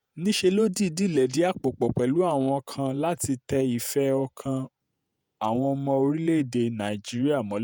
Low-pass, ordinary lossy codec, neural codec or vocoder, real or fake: none; none; vocoder, 48 kHz, 128 mel bands, Vocos; fake